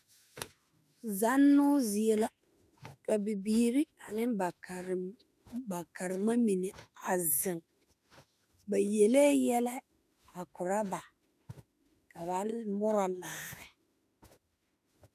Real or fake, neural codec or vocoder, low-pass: fake; autoencoder, 48 kHz, 32 numbers a frame, DAC-VAE, trained on Japanese speech; 14.4 kHz